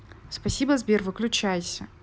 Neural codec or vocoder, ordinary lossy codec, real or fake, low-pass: none; none; real; none